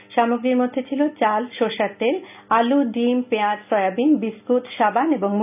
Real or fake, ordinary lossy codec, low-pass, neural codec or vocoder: real; none; 3.6 kHz; none